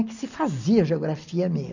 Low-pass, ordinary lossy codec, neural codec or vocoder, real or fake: 7.2 kHz; none; none; real